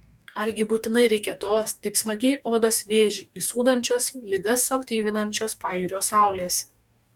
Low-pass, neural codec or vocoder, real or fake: 19.8 kHz; codec, 44.1 kHz, 2.6 kbps, DAC; fake